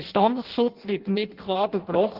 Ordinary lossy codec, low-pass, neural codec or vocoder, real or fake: Opus, 16 kbps; 5.4 kHz; codec, 16 kHz in and 24 kHz out, 0.6 kbps, FireRedTTS-2 codec; fake